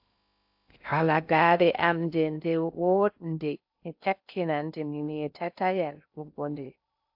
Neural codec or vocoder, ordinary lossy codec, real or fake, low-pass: codec, 16 kHz in and 24 kHz out, 0.6 kbps, FocalCodec, streaming, 2048 codes; AAC, 48 kbps; fake; 5.4 kHz